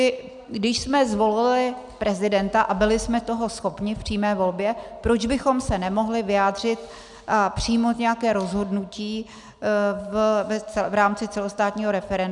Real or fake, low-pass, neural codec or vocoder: real; 10.8 kHz; none